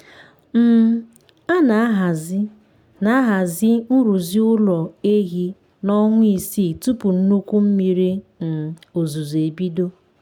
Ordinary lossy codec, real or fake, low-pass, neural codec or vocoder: none; real; 19.8 kHz; none